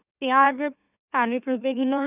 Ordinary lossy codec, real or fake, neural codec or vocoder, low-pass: none; fake; autoencoder, 44.1 kHz, a latent of 192 numbers a frame, MeloTTS; 3.6 kHz